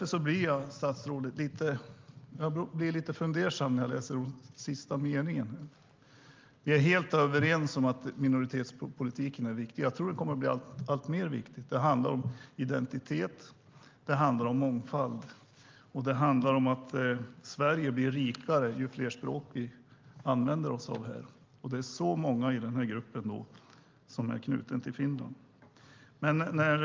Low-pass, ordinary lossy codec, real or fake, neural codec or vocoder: 7.2 kHz; Opus, 32 kbps; real; none